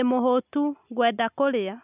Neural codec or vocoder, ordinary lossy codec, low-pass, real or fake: none; none; 3.6 kHz; real